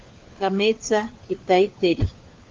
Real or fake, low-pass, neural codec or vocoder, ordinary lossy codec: fake; 7.2 kHz; codec, 16 kHz, 4 kbps, FunCodec, trained on LibriTTS, 50 frames a second; Opus, 24 kbps